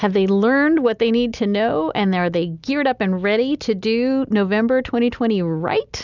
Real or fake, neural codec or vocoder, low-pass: real; none; 7.2 kHz